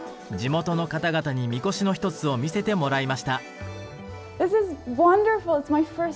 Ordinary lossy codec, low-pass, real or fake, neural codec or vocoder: none; none; real; none